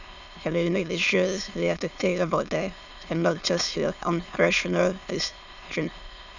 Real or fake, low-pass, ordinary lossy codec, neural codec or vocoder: fake; 7.2 kHz; none; autoencoder, 22.05 kHz, a latent of 192 numbers a frame, VITS, trained on many speakers